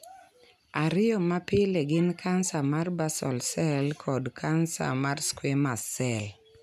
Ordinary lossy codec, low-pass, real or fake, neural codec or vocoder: none; 14.4 kHz; real; none